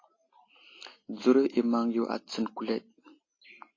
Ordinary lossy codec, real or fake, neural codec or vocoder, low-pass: AAC, 32 kbps; real; none; 7.2 kHz